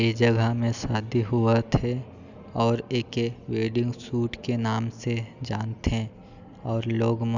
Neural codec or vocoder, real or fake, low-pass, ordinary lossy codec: none; real; 7.2 kHz; none